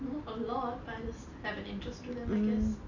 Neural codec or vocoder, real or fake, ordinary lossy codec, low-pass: none; real; none; 7.2 kHz